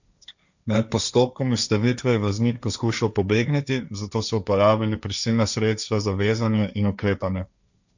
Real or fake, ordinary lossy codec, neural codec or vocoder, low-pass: fake; none; codec, 16 kHz, 1.1 kbps, Voila-Tokenizer; 7.2 kHz